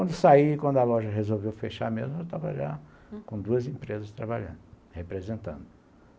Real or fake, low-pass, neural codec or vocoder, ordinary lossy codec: real; none; none; none